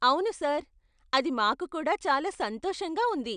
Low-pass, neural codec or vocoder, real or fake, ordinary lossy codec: 9.9 kHz; none; real; none